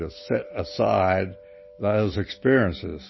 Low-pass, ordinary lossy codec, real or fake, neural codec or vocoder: 7.2 kHz; MP3, 24 kbps; real; none